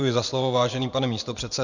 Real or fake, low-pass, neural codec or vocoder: fake; 7.2 kHz; vocoder, 24 kHz, 100 mel bands, Vocos